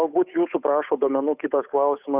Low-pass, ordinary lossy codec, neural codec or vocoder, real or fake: 3.6 kHz; Opus, 64 kbps; codec, 16 kHz, 8 kbps, FunCodec, trained on Chinese and English, 25 frames a second; fake